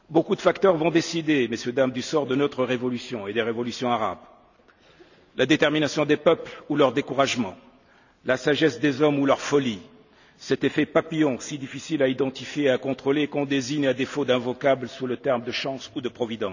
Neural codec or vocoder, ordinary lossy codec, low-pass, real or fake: none; none; 7.2 kHz; real